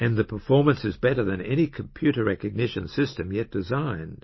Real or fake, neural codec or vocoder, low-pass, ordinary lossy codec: real; none; 7.2 kHz; MP3, 24 kbps